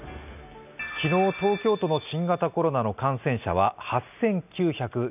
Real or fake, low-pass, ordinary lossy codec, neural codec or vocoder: real; 3.6 kHz; none; none